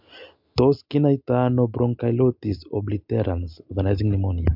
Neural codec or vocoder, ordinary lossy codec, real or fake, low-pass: none; MP3, 48 kbps; real; 5.4 kHz